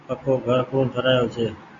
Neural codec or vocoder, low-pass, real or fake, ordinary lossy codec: none; 7.2 kHz; real; MP3, 64 kbps